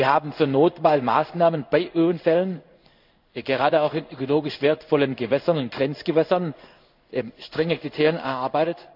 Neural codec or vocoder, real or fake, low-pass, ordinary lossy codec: codec, 16 kHz in and 24 kHz out, 1 kbps, XY-Tokenizer; fake; 5.4 kHz; none